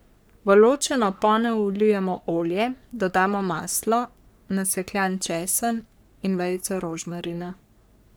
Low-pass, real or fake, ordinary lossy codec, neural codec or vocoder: none; fake; none; codec, 44.1 kHz, 3.4 kbps, Pupu-Codec